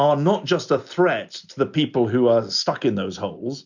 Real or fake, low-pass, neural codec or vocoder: real; 7.2 kHz; none